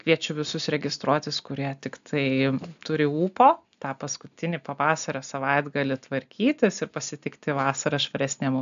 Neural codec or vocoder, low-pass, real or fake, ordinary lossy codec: none; 7.2 kHz; real; AAC, 96 kbps